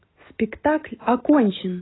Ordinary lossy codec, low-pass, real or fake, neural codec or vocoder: AAC, 16 kbps; 7.2 kHz; real; none